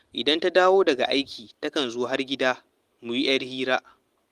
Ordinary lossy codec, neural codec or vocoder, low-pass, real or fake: Opus, 24 kbps; none; 14.4 kHz; real